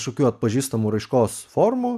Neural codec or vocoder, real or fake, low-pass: none; real; 14.4 kHz